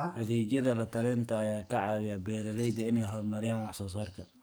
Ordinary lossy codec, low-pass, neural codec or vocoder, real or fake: none; none; codec, 44.1 kHz, 2.6 kbps, SNAC; fake